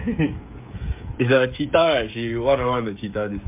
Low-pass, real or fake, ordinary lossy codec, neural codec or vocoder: 3.6 kHz; fake; MP3, 32 kbps; codec, 16 kHz, 16 kbps, FreqCodec, smaller model